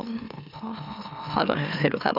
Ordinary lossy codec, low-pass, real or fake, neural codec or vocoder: none; 5.4 kHz; fake; autoencoder, 44.1 kHz, a latent of 192 numbers a frame, MeloTTS